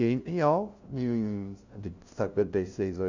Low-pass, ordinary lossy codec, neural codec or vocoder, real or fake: 7.2 kHz; Opus, 64 kbps; codec, 16 kHz, 0.5 kbps, FunCodec, trained on LibriTTS, 25 frames a second; fake